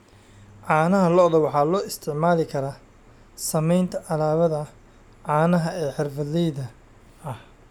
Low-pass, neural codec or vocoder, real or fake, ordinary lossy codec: 19.8 kHz; none; real; none